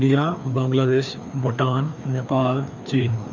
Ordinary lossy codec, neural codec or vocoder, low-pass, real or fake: none; codec, 16 kHz, 2 kbps, FreqCodec, larger model; 7.2 kHz; fake